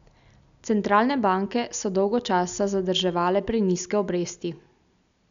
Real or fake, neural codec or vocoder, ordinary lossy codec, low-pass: real; none; MP3, 96 kbps; 7.2 kHz